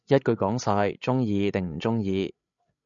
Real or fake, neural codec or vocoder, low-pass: fake; codec, 16 kHz, 16 kbps, FreqCodec, larger model; 7.2 kHz